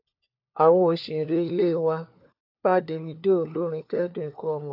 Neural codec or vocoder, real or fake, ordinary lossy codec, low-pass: codec, 16 kHz, 4 kbps, FunCodec, trained on LibriTTS, 50 frames a second; fake; AAC, 48 kbps; 5.4 kHz